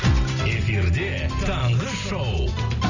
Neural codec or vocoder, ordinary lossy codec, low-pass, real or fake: none; none; 7.2 kHz; real